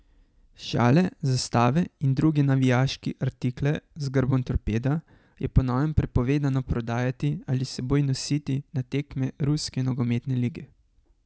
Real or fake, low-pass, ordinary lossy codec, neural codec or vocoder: real; none; none; none